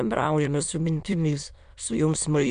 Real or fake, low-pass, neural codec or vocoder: fake; 9.9 kHz; autoencoder, 22.05 kHz, a latent of 192 numbers a frame, VITS, trained on many speakers